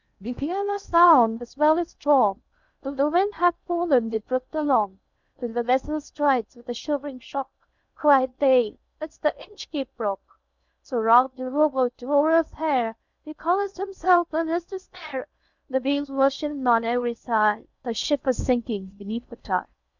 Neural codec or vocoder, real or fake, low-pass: codec, 16 kHz in and 24 kHz out, 0.6 kbps, FocalCodec, streaming, 2048 codes; fake; 7.2 kHz